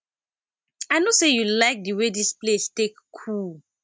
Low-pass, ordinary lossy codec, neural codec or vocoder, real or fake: none; none; none; real